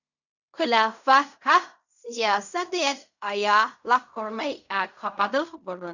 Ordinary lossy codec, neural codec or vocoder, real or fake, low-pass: none; codec, 16 kHz in and 24 kHz out, 0.4 kbps, LongCat-Audio-Codec, fine tuned four codebook decoder; fake; 7.2 kHz